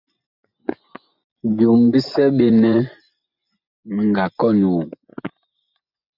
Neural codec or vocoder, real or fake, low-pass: vocoder, 24 kHz, 100 mel bands, Vocos; fake; 5.4 kHz